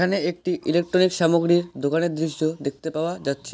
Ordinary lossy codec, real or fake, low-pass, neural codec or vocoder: none; real; none; none